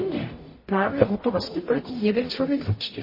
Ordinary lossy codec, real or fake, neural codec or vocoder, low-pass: MP3, 24 kbps; fake; codec, 44.1 kHz, 0.9 kbps, DAC; 5.4 kHz